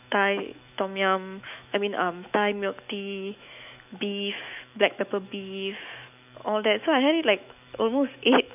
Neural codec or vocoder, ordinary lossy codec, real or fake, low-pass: autoencoder, 48 kHz, 128 numbers a frame, DAC-VAE, trained on Japanese speech; none; fake; 3.6 kHz